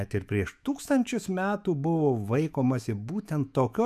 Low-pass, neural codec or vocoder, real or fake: 14.4 kHz; autoencoder, 48 kHz, 128 numbers a frame, DAC-VAE, trained on Japanese speech; fake